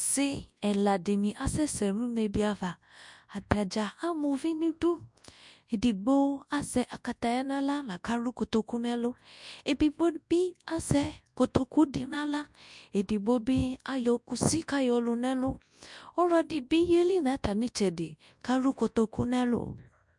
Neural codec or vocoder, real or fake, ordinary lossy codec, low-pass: codec, 24 kHz, 0.9 kbps, WavTokenizer, large speech release; fake; MP3, 64 kbps; 10.8 kHz